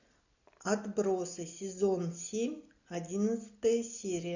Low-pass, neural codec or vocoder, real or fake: 7.2 kHz; none; real